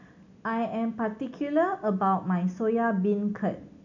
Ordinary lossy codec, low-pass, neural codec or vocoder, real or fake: none; 7.2 kHz; none; real